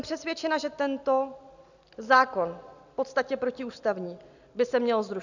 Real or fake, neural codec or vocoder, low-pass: real; none; 7.2 kHz